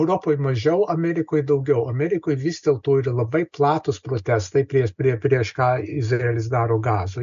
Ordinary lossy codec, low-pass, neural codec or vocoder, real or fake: AAC, 96 kbps; 7.2 kHz; none; real